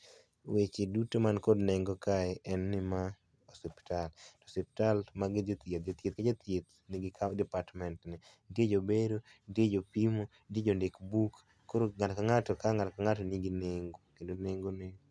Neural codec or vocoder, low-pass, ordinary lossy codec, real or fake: none; none; none; real